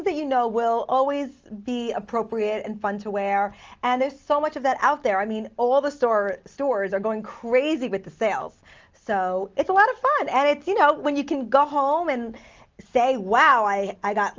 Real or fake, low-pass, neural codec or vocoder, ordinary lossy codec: real; 7.2 kHz; none; Opus, 24 kbps